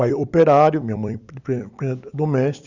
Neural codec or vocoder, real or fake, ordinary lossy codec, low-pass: vocoder, 44.1 kHz, 128 mel bands every 256 samples, BigVGAN v2; fake; Opus, 64 kbps; 7.2 kHz